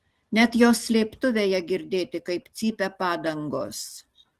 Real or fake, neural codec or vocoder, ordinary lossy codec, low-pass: real; none; Opus, 16 kbps; 14.4 kHz